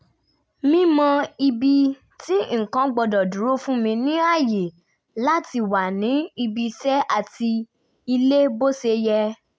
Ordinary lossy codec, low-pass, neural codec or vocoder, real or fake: none; none; none; real